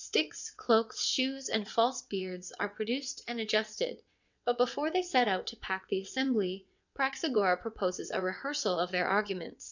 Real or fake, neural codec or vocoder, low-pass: fake; vocoder, 22.05 kHz, 80 mel bands, WaveNeXt; 7.2 kHz